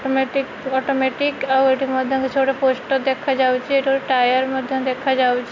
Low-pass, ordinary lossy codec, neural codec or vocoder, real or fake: 7.2 kHz; MP3, 64 kbps; none; real